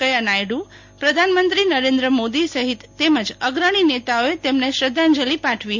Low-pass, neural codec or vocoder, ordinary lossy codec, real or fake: 7.2 kHz; none; MP3, 48 kbps; real